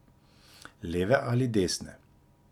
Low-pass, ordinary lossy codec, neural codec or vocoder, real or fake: 19.8 kHz; none; vocoder, 44.1 kHz, 128 mel bands every 256 samples, BigVGAN v2; fake